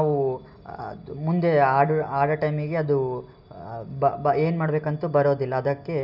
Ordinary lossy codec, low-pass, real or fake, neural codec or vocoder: none; 5.4 kHz; real; none